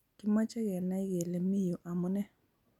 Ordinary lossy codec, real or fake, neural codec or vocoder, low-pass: none; real; none; 19.8 kHz